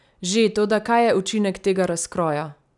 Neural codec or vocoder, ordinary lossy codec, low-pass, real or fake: none; none; 10.8 kHz; real